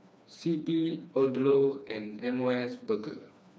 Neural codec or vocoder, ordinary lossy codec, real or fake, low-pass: codec, 16 kHz, 2 kbps, FreqCodec, smaller model; none; fake; none